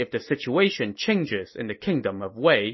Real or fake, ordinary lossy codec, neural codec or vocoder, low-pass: real; MP3, 24 kbps; none; 7.2 kHz